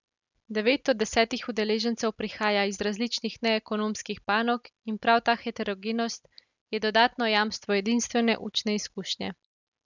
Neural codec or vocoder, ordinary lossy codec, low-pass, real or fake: none; none; 7.2 kHz; real